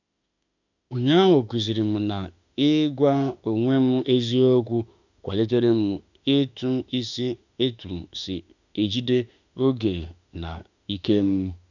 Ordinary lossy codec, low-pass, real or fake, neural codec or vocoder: none; 7.2 kHz; fake; autoencoder, 48 kHz, 32 numbers a frame, DAC-VAE, trained on Japanese speech